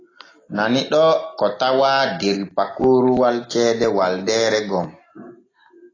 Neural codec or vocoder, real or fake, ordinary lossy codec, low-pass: none; real; AAC, 32 kbps; 7.2 kHz